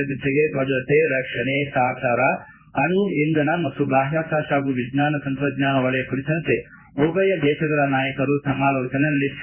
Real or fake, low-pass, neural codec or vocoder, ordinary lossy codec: fake; 3.6 kHz; codec, 16 kHz in and 24 kHz out, 1 kbps, XY-Tokenizer; Opus, 64 kbps